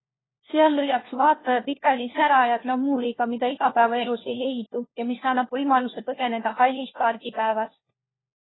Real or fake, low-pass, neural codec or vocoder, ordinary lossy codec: fake; 7.2 kHz; codec, 16 kHz, 1 kbps, FunCodec, trained on LibriTTS, 50 frames a second; AAC, 16 kbps